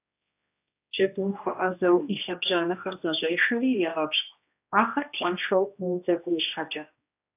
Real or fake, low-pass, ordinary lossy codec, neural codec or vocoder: fake; 3.6 kHz; AAC, 32 kbps; codec, 16 kHz, 1 kbps, X-Codec, HuBERT features, trained on general audio